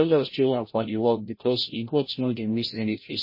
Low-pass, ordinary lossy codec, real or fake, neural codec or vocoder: 5.4 kHz; MP3, 24 kbps; fake; codec, 16 kHz, 0.5 kbps, FreqCodec, larger model